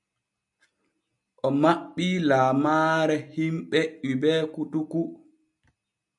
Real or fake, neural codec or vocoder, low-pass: real; none; 10.8 kHz